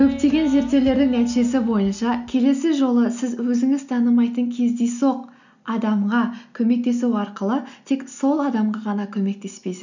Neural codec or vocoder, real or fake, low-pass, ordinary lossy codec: none; real; 7.2 kHz; none